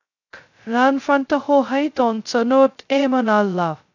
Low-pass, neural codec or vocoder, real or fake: 7.2 kHz; codec, 16 kHz, 0.2 kbps, FocalCodec; fake